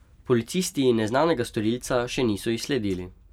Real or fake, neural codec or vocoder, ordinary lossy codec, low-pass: fake; vocoder, 44.1 kHz, 128 mel bands every 256 samples, BigVGAN v2; none; 19.8 kHz